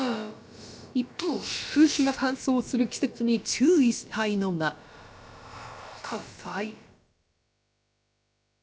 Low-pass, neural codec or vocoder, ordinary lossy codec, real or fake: none; codec, 16 kHz, about 1 kbps, DyCAST, with the encoder's durations; none; fake